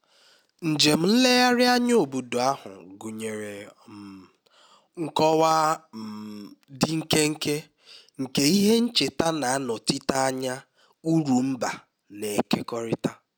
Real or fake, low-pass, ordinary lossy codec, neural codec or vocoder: real; none; none; none